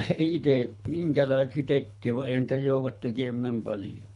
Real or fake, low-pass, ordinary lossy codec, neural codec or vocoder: fake; 10.8 kHz; none; codec, 24 kHz, 3 kbps, HILCodec